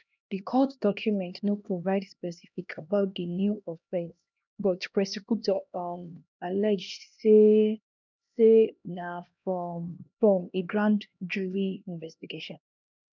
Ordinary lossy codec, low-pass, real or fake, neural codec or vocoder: none; 7.2 kHz; fake; codec, 16 kHz, 1 kbps, X-Codec, HuBERT features, trained on LibriSpeech